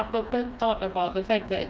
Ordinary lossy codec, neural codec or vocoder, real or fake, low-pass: none; codec, 16 kHz, 2 kbps, FreqCodec, smaller model; fake; none